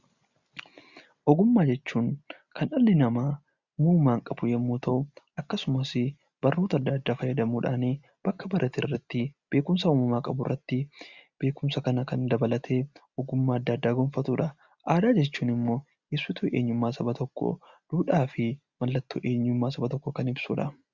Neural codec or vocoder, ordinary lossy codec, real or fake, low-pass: none; Opus, 64 kbps; real; 7.2 kHz